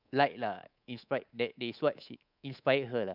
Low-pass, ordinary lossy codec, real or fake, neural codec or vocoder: 5.4 kHz; none; fake; codec, 24 kHz, 3.1 kbps, DualCodec